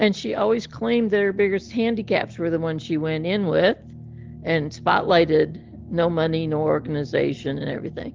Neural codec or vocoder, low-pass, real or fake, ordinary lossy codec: none; 7.2 kHz; real; Opus, 16 kbps